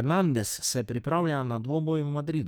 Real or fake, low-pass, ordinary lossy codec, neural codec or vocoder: fake; none; none; codec, 44.1 kHz, 2.6 kbps, SNAC